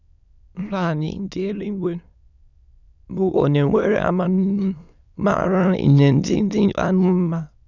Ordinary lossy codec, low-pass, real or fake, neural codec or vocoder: none; 7.2 kHz; fake; autoencoder, 22.05 kHz, a latent of 192 numbers a frame, VITS, trained on many speakers